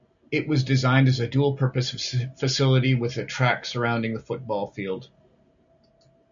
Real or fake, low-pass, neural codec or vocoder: real; 7.2 kHz; none